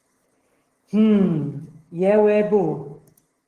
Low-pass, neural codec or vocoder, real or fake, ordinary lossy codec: 14.4 kHz; codec, 44.1 kHz, 7.8 kbps, DAC; fake; Opus, 16 kbps